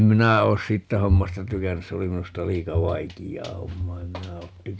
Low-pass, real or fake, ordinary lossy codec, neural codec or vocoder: none; real; none; none